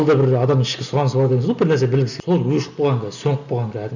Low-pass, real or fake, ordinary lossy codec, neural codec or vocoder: 7.2 kHz; real; none; none